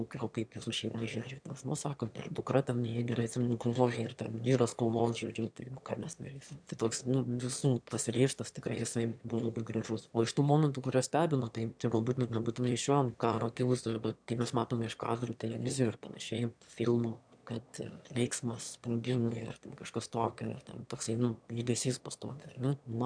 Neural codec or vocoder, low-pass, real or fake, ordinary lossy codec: autoencoder, 22.05 kHz, a latent of 192 numbers a frame, VITS, trained on one speaker; 9.9 kHz; fake; MP3, 96 kbps